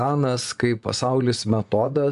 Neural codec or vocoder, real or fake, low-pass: vocoder, 24 kHz, 100 mel bands, Vocos; fake; 10.8 kHz